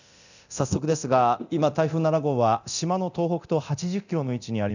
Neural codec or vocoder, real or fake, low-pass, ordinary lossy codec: codec, 24 kHz, 0.9 kbps, DualCodec; fake; 7.2 kHz; none